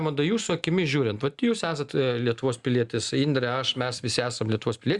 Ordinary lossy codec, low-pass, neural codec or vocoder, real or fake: Opus, 64 kbps; 10.8 kHz; none; real